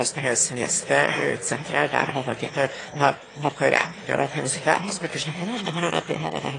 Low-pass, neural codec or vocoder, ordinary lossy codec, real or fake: 9.9 kHz; autoencoder, 22.05 kHz, a latent of 192 numbers a frame, VITS, trained on one speaker; AAC, 32 kbps; fake